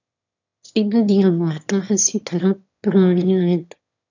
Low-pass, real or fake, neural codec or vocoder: 7.2 kHz; fake; autoencoder, 22.05 kHz, a latent of 192 numbers a frame, VITS, trained on one speaker